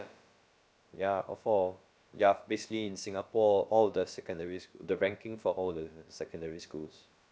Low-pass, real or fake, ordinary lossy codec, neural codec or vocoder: none; fake; none; codec, 16 kHz, about 1 kbps, DyCAST, with the encoder's durations